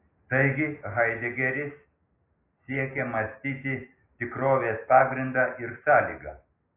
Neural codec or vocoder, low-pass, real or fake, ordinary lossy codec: none; 3.6 kHz; real; AAC, 24 kbps